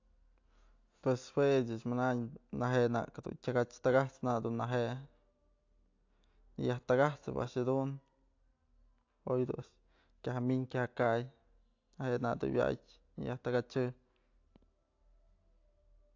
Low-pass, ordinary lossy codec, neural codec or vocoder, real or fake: 7.2 kHz; AAC, 48 kbps; none; real